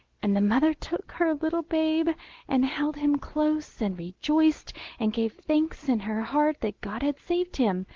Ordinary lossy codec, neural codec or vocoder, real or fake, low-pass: Opus, 16 kbps; none; real; 7.2 kHz